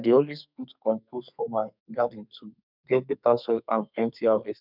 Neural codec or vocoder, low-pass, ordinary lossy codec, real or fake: codec, 32 kHz, 1.9 kbps, SNAC; 5.4 kHz; none; fake